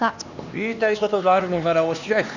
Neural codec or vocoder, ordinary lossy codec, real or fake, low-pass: codec, 16 kHz, 2 kbps, X-Codec, HuBERT features, trained on LibriSpeech; none; fake; 7.2 kHz